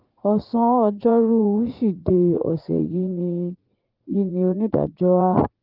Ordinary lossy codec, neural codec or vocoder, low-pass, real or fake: Opus, 32 kbps; vocoder, 44.1 kHz, 80 mel bands, Vocos; 5.4 kHz; fake